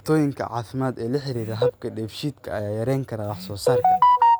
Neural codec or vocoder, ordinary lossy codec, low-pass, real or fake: none; none; none; real